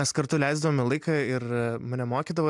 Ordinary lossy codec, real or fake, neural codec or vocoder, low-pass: MP3, 96 kbps; real; none; 10.8 kHz